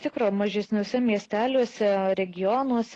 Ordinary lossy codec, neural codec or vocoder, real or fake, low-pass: AAC, 32 kbps; none; real; 9.9 kHz